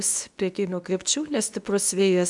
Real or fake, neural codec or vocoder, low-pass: fake; codec, 24 kHz, 0.9 kbps, WavTokenizer, medium speech release version 2; 10.8 kHz